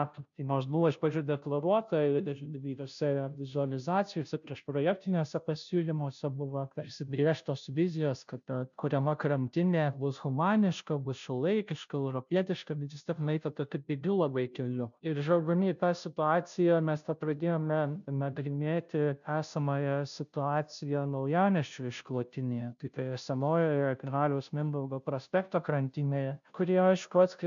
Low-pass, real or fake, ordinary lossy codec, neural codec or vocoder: 7.2 kHz; fake; MP3, 96 kbps; codec, 16 kHz, 0.5 kbps, FunCodec, trained on Chinese and English, 25 frames a second